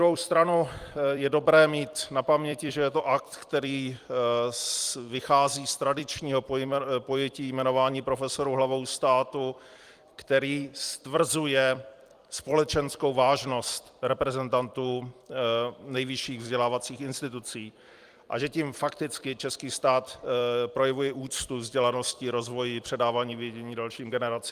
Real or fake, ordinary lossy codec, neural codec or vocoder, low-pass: fake; Opus, 32 kbps; vocoder, 44.1 kHz, 128 mel bands every 256 samples, BigVGAN v2; 14.4 kHz